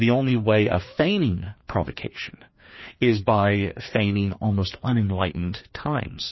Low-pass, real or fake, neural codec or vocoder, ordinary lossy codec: 7.2 kHz; fake; codec, 16 kHz, 2 kbps, FreqCodec, larger model; MP3, 24 kbps